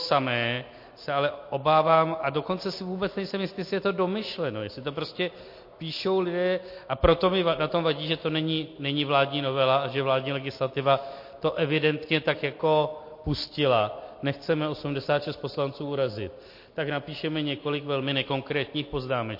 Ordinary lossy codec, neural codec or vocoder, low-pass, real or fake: MP3, 32 kbps; none; 5.4 kHz; real